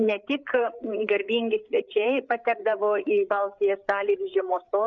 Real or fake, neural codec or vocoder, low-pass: fake; codec, 16 kHz, 8 kbps, FreqCodec, larger model; 7.2 kHz